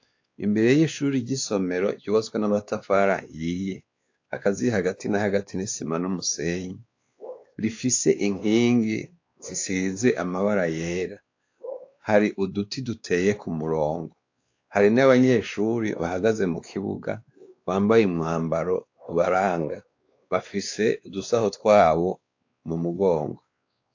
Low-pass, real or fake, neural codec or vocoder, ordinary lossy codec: 7.2 kHz; fake; codec, 16 kHz, 2 kbps, X-Codec, WavLM features, trained on Multilingual LibriSpeech; AAC, 48 kbps